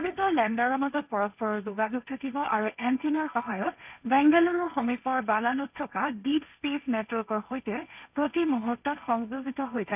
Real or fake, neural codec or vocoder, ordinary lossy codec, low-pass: fake; codec, 16 kHz, 1.1 kbps, Voila-Tokenizer; none; 3.6 kHz